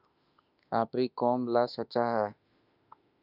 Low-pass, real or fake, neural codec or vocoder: 5.4 kHz; fake; autoencoder, 48 kHz, 32 numbers a frame, DAC-VAE, trained on Japanese speech